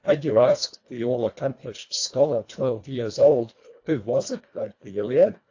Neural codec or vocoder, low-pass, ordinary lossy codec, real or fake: codec, 24 kHz, 1.5 kbps, HILCodec; 7.2 kHz; AAC, 48 kbps; fake